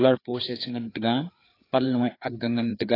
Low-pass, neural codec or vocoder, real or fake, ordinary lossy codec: 5.4 kHz; codec, 16 kHz, 4 kbps, FreqCodec, larger model; fake; AAC, 24 kbps